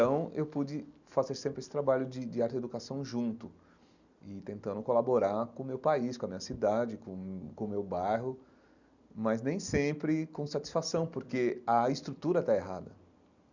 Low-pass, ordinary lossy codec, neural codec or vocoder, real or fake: 7.2 kHz; none; none; real